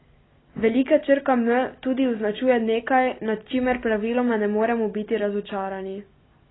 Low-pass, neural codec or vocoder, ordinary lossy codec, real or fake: 7.2 kHz; none; AAC, 16 kbps; real